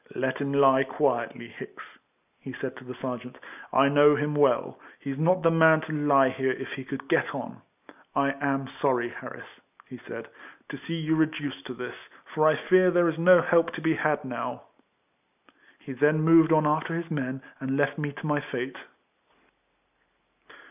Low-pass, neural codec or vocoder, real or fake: 3.6 kHz; none; real